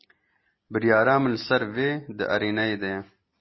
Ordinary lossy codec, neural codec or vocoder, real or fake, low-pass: MP3, 24 kbps; none; real; 7.2 kHz